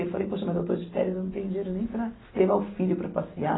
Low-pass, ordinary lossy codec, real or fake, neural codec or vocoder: 7.2 kHz; AAC, 16 kbps; real; none